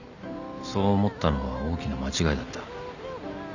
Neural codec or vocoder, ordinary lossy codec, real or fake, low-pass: none; none; real; 7.2 kHz